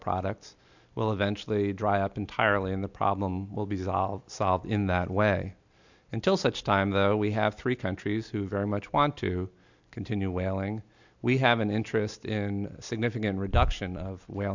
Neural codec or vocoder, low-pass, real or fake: none; 7.2 kHz; real